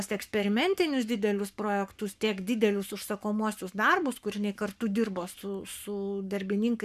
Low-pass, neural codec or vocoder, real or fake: 14.4 kHz; codec, 44.1 kHz, 7.8 kbps, Pupu-Codec; fake